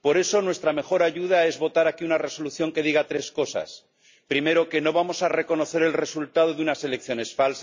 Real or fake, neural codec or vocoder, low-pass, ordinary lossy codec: real; none; 7.2 kHz; MP3, 64 kbps